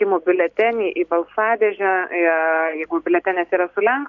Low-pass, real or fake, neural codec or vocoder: 7.2 kHz; real; none